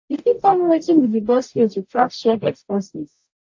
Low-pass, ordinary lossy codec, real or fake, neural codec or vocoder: 7.2 kHz; AAC, 48 kbps; fake; codec, 44.1 kHz, 0.9 kbps, DAC